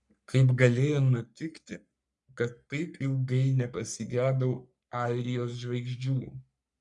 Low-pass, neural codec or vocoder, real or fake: 10.8 kHz; codec, 44.1 kHz, 3.4 kbps, Pupu-Codec; fake